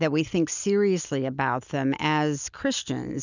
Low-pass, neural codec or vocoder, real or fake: 7.2 kHz; none; real